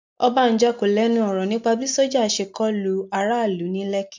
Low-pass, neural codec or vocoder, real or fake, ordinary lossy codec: 7.2 kHz; none; real; MP3, 64 kbps